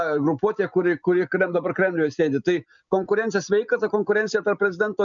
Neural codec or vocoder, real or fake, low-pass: none; real; 7.2 kHz